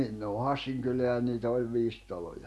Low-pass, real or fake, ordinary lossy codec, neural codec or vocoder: none; real; none; none